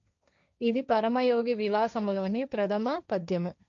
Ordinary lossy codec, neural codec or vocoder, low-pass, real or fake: none; codec, 16 kHz, 1.1 kbps, Voila-Tokenizer; 7.2 kHz; fake